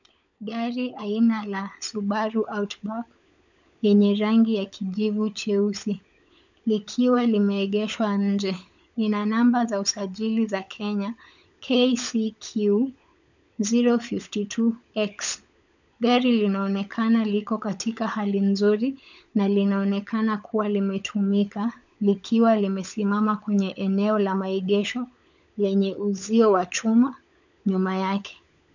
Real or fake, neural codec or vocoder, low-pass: fake; codec, 16 kHz, 16 kbps, FunCodec, trained on LibriTTS, 50 frames a second; 7.2 kHz